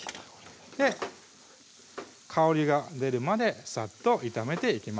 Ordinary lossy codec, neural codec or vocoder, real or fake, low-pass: none; none; real; none